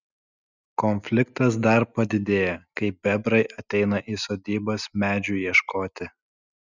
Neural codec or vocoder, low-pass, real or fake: none; 7.2 kHz; real